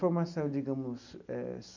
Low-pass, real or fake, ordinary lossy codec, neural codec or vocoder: 7.2 kHz; real; none; none